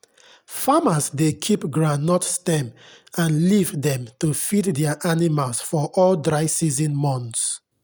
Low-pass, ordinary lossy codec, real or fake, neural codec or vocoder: none; none; real; none